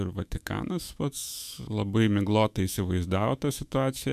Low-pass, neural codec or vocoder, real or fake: 14.4 kHz; autoencoder, 48 kHz, 128 numbers a frame, DAC-VAE, trained on Japanese speech; fake